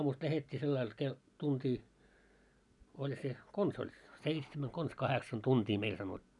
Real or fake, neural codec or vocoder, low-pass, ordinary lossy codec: real; none; 10.8 kHz; none